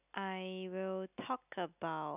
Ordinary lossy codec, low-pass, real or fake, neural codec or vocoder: none; 3.6 kHz; real; none